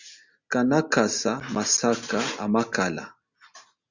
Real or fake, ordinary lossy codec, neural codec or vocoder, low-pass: real; Opus, 64 kbps; none; 7.2 kHz